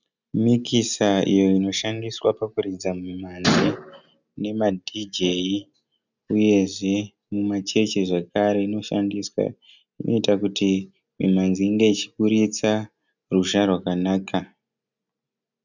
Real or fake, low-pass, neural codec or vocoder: real; 7.2 kHz; none